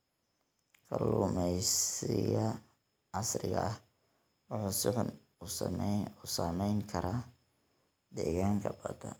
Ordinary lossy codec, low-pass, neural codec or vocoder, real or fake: none; none; none; real